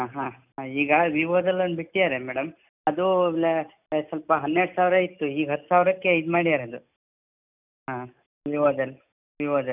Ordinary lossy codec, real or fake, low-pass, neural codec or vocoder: none; real; 3.6 kHz; none